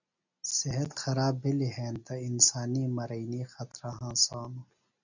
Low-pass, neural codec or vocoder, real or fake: 7.2 kHz; none; real